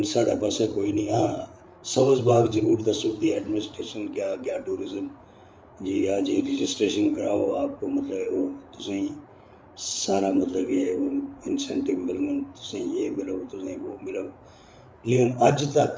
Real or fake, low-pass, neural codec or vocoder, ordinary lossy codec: fake; none; codec, 16 kHz, 8 kbps, FreqCodec, larger model; none